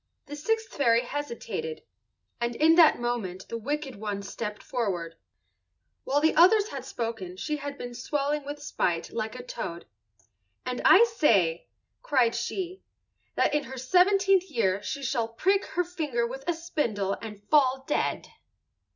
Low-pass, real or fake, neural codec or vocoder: 7.2 kHz; real; none